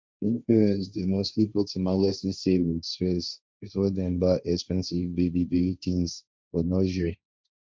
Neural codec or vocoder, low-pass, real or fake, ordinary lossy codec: codec, 16 kHz, 1.1 kbps, Voila-Tokenizer; none; fake; none